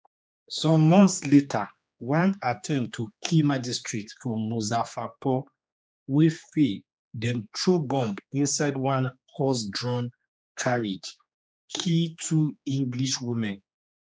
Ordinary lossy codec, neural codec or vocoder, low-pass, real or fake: none; codec, 16 kHz, 2 kbps, X-Codec, HuBERT features, trained on general audio; none; fake